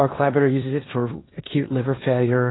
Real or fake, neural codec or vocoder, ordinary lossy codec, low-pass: fake; codec, 16 kHz in and 24 kHz out, 0.9 kbps, LongCat-Audio-Codec, four codebook decoder; AAC, 16 kbps; 7.2 kHz